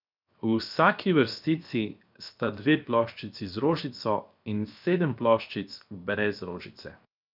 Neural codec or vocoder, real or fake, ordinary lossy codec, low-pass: codec, 16 kHz, 0.7 kbps, FocalCodec; fake; none; 5.4 kHz